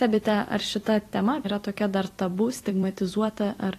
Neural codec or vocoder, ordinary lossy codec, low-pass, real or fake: vocoder, 44.1 kHz, 128 mel bands every 256 samples, BigVGAN v2; AAC, 48 kbps; 14.4 kHz; fake